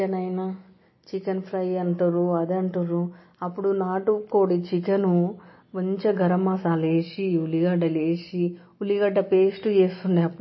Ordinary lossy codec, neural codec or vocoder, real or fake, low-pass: MP3, 24 kbps; none; real; 7.2 kHz